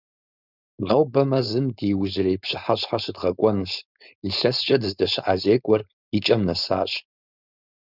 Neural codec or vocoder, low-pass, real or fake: codec, 16 kHz, 4.8 kbps, FACodec; 5.4 kHz; fake